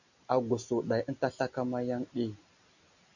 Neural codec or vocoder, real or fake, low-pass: none; real; 7.2 kHz